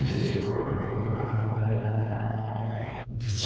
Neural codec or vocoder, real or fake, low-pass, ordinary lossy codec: codec, 16 kHz, 2 kbps, X-Codec, WavLM features, trained on Multilingual LibriSpeech; fake; none; none